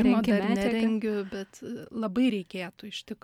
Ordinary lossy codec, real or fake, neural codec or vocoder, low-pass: MP3, 96 kbps; real; none; 19.8 kHz